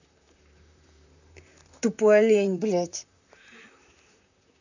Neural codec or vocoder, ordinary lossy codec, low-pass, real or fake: vocoder, 44.1 kHz, 128 mel bands, Pupu-Vocoder; none; 7.2 kHz; fake